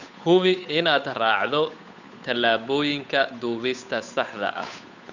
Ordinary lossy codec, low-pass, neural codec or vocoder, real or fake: none; 7.2 kHz; codec, 16 kHz, 8 kbps, FunCodec, trained on Chinese and English, 25 frames a second; fake